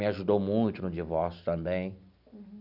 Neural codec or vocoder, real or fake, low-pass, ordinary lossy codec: none; real; 5.4 kHz; none